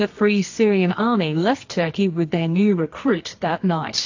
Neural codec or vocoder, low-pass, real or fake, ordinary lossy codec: codec, 24 kHz, 0.9 kbps, WavTokenizer, medium music audio release; 7.2 kHz; fake; AAC, 48 kbps